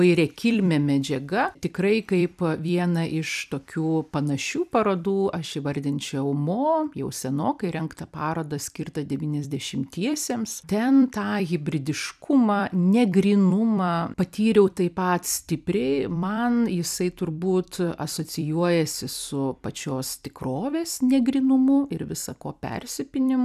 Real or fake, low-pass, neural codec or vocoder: fake; 14.4 kHz; vocoder, 44.1 kHz, 128 mel bands every 256 samples, BigVGAN v2